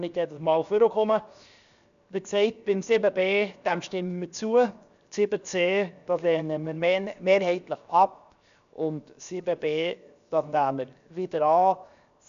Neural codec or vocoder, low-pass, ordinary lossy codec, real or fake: codec, 16 kHz, 0.7 kbps, FocalCodec; 7.2 kHz; none; fake